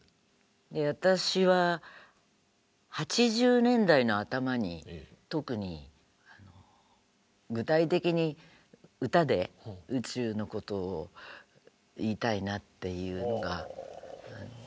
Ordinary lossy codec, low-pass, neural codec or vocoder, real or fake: none; none; none; real